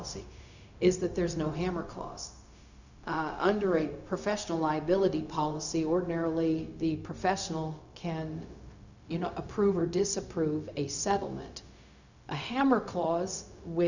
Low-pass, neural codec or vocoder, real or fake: 7.2 kHz; codec, 16 kHz, 0.4 kbps, LongCat-Audio-Codec; fake